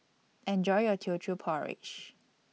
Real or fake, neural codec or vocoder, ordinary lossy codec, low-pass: real; none; none; none